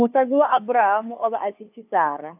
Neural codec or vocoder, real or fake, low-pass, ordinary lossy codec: codec, 16 kHz, 2 kbps, FunCodec, trained on LibriTTS, 25 frames a second; fake; 3.6 kHz; AAC, 32 kbps